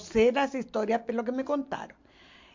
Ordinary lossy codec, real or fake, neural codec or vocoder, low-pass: MP3, 48 kbps; real; none; 7.2 kHz